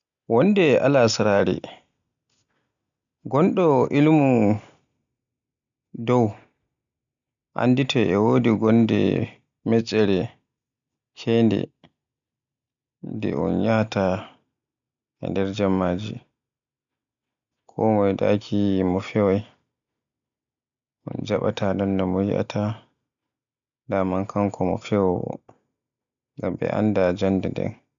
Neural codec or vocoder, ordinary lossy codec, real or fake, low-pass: none; none; real; 7.2 kHz